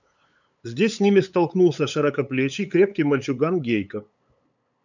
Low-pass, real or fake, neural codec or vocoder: 7.2 kHz; fake; codec, 16 kHz, 8 kbps, FunCodec, trained on LibriTTS, 25 frames a second